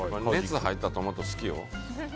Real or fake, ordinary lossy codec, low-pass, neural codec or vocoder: real; none; none; none